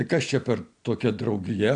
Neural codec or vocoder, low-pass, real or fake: none; 9.9 kHz; real